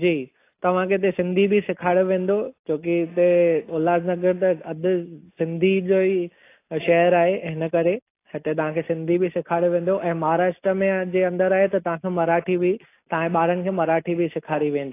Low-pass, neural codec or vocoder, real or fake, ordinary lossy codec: 3.6 kHz; none; real; AAC, 24 kbps